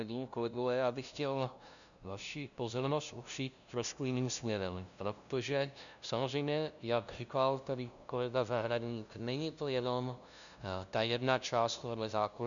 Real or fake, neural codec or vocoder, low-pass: fake; codec, 16 kHz, 0.5 kbps, FunCodec, trained on LibriTTS, 25 frames a second; 7.2 kHz